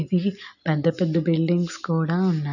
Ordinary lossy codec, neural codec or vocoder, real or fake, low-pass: none; none; real; 7.2 kHz